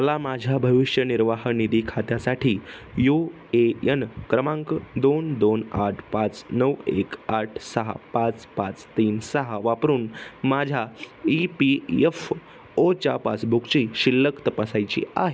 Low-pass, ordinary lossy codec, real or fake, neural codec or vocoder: none; none; real; none